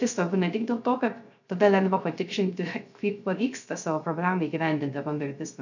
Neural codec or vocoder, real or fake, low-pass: codec, 16 kHz, 0.3 kbps, FocalCodec; fake; 7.2 kHz